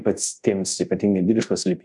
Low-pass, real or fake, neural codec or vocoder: 10.8 kHz; fake; codec, 24 kHz, 0.5 kbps, DualCodec